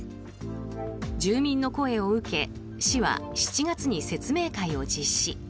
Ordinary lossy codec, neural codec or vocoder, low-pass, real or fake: none; none; none; real